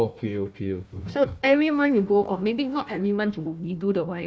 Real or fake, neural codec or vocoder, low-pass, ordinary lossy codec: fake; codec, 16 kHz, 1 kbps, FunCodec, trained on Chinese and English, 50 frames a second; none; none